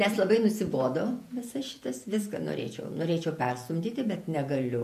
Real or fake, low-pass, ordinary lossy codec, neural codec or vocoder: fake; 14.4 kHz; MP3, 64 kbps; vocoder, 48 kHz, 128 mel bands, Vocos